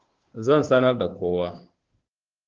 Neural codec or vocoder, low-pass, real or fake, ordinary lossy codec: codec, 16 kHz, 2 kbps, FunCodec, trained on Chinese and English, 25 frames a second; 7.2 kHz; fake; Opus, 16 kbps